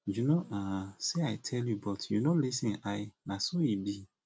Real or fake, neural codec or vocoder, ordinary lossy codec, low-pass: real; none; none; none